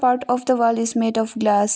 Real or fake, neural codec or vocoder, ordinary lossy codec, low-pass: real; none; none; none